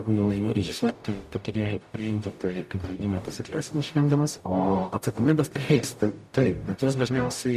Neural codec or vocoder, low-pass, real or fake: codec, 44.1 kHz, 0.9 kbps, DAC; 14.4 kHz; fake